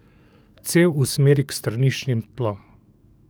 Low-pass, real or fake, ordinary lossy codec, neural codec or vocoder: none; fake; none; codec, 44.1 kHz, 7.8 kbps, DAC